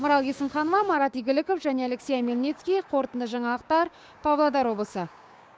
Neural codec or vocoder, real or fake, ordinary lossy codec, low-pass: codec, 16 kHz, 6 kbps, DAC; fake; none; none